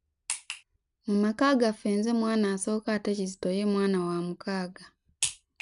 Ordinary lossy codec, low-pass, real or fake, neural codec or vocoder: MP3, 96 kbps; 10.8 kHz; real; none